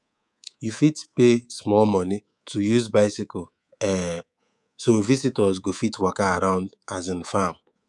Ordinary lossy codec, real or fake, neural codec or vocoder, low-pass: none; fake; codec, 24 kHz, 3.1 kbps, DualCodec; 10.8 kHz